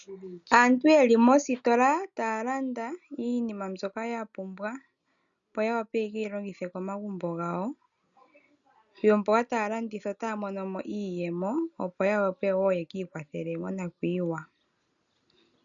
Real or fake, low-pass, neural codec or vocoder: real; 7.2 kHz; none